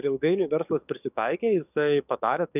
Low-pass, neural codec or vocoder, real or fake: 3.6 kHz; codec, 44.1 kHz, 7.8 kbps, DAC; fake